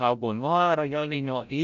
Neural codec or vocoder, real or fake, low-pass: codec, 16 kHz, 0.5 kbps, FreqCodec, larger model; fake; 7.2 kHz